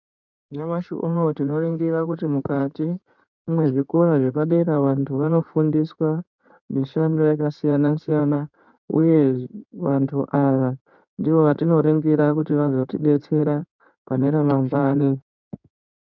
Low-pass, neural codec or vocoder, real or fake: 7.2 kHz; codec, 16 kHz in and 24 kHz out, 2.2 kbps, FireRedTTS-2 codec; fake